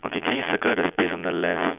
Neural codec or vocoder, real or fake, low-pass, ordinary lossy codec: vocoder, 22.05 kHz, 80 mel bands, Vocos; fake; 3.6 kHz; none